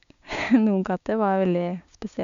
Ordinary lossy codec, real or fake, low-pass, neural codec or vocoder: none; fake; 7.2 kHz; codec, 16 kHz, 6 kbps, DAC